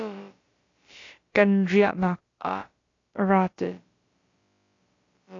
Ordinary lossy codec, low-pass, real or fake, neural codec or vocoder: AAC, 32 kbps; 7.2 kHz; fake; codec, 16 kHz, about 1 kbps, DyCAST, with the encoder's durations